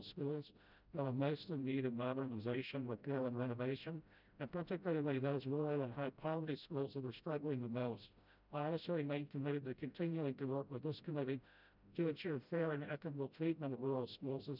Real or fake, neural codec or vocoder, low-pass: fake; codec, 16 kHz, 0.5 kbps, FreqCodec, smaller model; 5.4 kHz